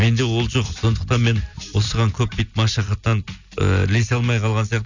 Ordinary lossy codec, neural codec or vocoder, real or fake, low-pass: none; none; real; 7.2 kHz